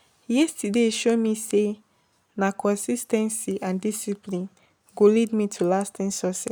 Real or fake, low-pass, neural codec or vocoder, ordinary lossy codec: real; none; none; none